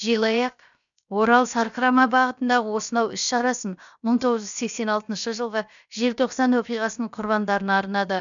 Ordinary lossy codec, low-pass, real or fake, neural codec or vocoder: none; 7.2 kHz; fake; codec, 16 kHz, about 1 kbps, DyCAST, with the encoder's durations